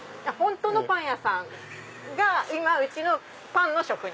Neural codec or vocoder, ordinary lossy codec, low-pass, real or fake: none; none; none; real